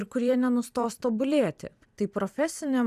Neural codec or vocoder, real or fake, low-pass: vocoder, 44.1 kHz, 128 mel bands every 256 samples, BigVGAN v2; fake; 14.4 kHz